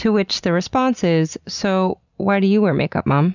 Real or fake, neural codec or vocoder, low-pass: real; none; 7.2 kHz